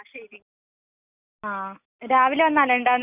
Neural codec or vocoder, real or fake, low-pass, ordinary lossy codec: none; real; 3.6 kHz; none